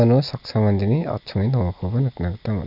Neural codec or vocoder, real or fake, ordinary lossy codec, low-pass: none; real; none; 5.4 kHz